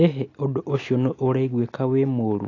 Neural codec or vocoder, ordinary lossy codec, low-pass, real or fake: none; AAC, 32 kbps; 7.2 kHz; real